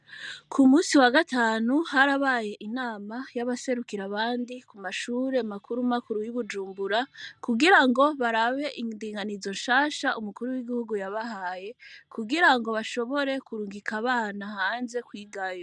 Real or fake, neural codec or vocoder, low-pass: real; none; 10.8 kHz